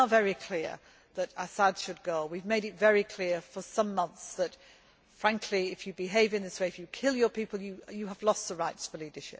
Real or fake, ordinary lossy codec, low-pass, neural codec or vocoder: real; none; none; none